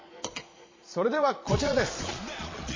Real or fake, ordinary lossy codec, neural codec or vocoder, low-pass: fake; MP3, 32 kbps; vocoder, 22.05 kHz, 80 mel bands, Vocos; 7.2 kHz